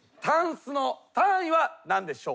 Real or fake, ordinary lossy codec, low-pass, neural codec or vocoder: real; none; none; none